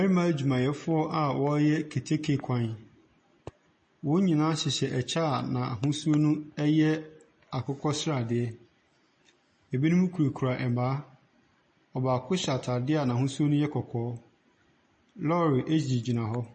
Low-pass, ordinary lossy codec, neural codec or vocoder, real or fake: 10.8 kHz; MP3, 32 kbps; none; real